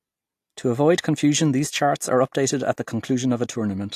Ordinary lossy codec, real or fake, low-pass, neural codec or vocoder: AAC, 64 kbps; real; 14.4 kHz; none